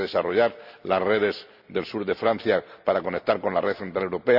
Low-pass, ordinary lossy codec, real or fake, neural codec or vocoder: 5.4 kHz; none; real; none